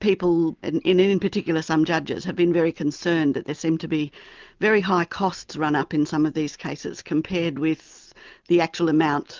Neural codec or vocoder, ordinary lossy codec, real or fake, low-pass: none; Opus, 32 kbps; real; 7.2 kHz